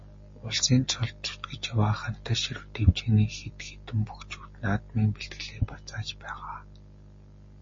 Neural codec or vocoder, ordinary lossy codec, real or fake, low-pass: none; MP3, 32 kbps; real; 7.2 kHz